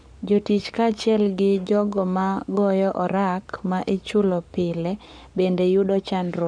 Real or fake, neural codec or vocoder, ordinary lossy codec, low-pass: fake; codec, 44.1 kHz, 7.8 kbps, Pupu-Codec; none; 9.9 kHz